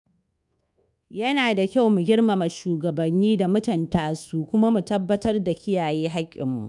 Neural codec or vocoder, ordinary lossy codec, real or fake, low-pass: codec, 24 kHz, 1.2 kbps, DualCodec; none; fake; 10.8 kHz